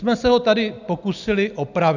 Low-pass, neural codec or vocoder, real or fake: 7.2 kHz; none; real